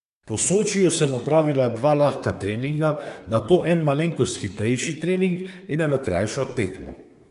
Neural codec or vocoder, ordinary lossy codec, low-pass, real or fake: codec, 24 kHz, 1 kbps, SNAC; none; 10.8 kHz; fake